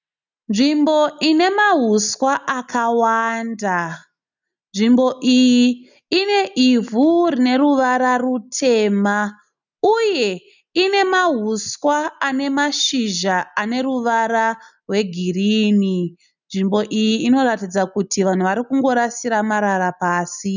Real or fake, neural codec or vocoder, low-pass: real; none; 7.2 kHz